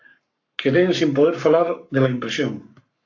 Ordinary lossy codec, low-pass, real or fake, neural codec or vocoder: AAC, 48 kbps; 7.2 kHz; fake; codec, 44.1 kHz, 7.8 kbps, Pupu-Codec